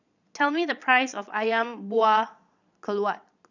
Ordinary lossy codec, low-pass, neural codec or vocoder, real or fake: none; 7.2 kHz; vocoder, 22.05 kHz, 80 mel bands, Vocos; fake